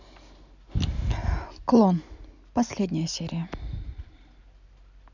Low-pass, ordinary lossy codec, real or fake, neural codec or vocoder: 7.2 kHz; none; real; none